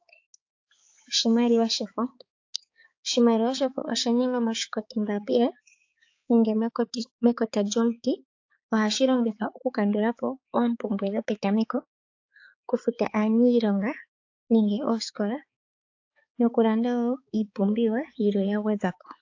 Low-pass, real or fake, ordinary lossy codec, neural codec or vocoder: 7.2 kHz; fake; AAC, 48 kbps; codec, 16 kHz, 4 kbps, X-Codec, HuBERT features, trained on balanced general audio